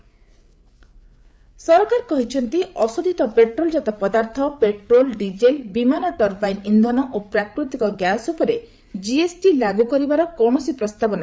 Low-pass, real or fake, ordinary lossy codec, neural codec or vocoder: none; fake; none; codec, 16 kHz, 8 kbps, FreqCodec, larger model